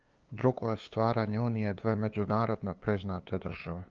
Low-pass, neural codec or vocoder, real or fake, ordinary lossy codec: 7.2 kHz; codec, 16 kHz, 2 kbps, FunCodec, trained on LibriTTS, 25 frames a second; fake; Opus, 24 kbps